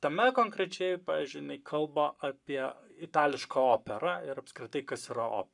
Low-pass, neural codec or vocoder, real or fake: 10.8 kHz; codec, 44.1 kHz, 7.8 kbps, Pupu-Codec; fake